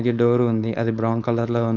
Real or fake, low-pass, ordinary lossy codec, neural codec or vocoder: fake; 7.2 kHz; none; codec, 16 kHz, 4.8 kbps, FACodec